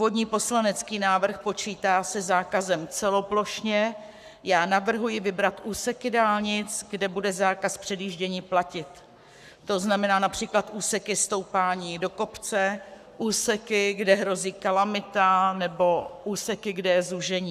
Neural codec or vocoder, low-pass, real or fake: codec, 44.1 kHz, 7.8 kbps, Pupu-Codec; 14.4 kHz; fake